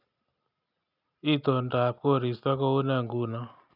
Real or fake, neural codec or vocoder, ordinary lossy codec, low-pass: real; none; none; 5.4 kHz